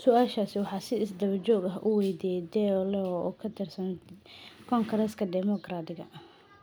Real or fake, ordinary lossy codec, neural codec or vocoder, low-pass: real; none; none; none